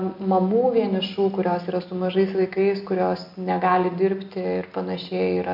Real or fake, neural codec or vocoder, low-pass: real; none; 5.4 kHz